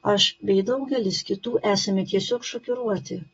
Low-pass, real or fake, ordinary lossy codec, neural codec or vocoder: 19.8 kHz; real; AAC, 24 kbps; none